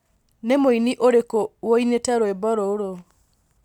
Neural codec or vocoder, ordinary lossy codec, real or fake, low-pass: none; none; real; 19.8 kHz